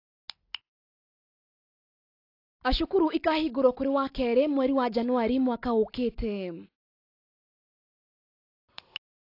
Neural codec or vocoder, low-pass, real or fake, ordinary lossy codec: none; 5.4 kHz; real; AAC, 32 kbps